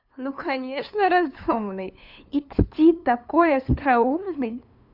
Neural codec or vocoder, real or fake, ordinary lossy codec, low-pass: codec, 16 kHz, 2 kbps, FunCodec, trained on LibriTTS, 25 frames a second; fake; none; 5.4 kHz